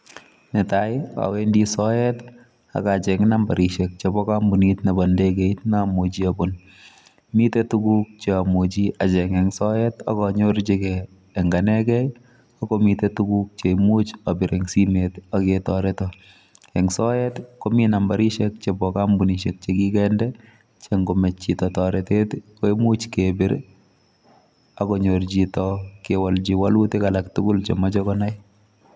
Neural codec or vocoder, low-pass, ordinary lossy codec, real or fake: none; none; none; real